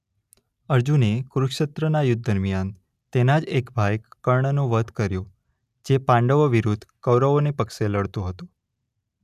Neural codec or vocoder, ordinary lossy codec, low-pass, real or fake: none; none; 14.4 kHz; real